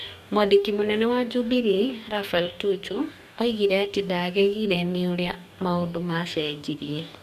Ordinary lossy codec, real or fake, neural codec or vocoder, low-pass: none; fake; codec, 44.1 kHz, 2.6 kbps, DAC; 14.4 kHz